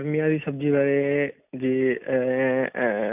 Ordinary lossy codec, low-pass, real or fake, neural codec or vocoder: none; 3.6 kHz; real; none